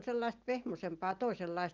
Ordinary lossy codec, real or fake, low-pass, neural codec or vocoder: Opus, 24 kbps; real; 7.2 kHz; none